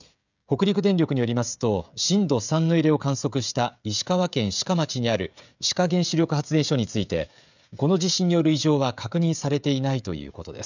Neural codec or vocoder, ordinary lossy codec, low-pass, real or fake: codec, 16 kHz, 16 kbps, FreqCodec, smaller model; none; 7.2 kHz; fake